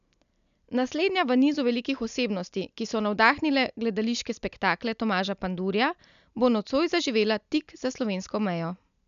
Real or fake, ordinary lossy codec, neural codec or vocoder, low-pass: real; none; none; 7.2 kHz